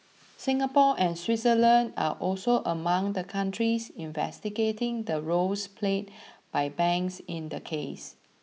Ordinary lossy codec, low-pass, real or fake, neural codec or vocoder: none; none; real; none